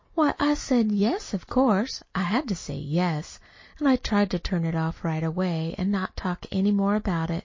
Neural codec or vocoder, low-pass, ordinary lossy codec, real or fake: none; 7.2 kHz; MP3, 32 kbps; real